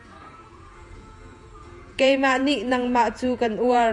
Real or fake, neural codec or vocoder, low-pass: fake; vocoder, 48 kHz, 128 mel bands, Vocos; 10.8 kHz